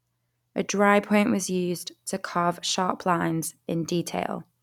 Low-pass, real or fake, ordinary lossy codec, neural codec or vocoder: 19.8 kHz; fake; none; vocoder, 44.1 kHz, 128 mel bands every 256 samples, BigVGAN v2